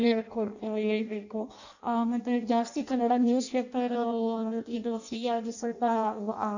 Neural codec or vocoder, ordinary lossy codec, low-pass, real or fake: codec, 16 kHz in and 24 kHz out, 0.6 kbps, FireRedTTS-2 codec; none; 7.2 kHz; fake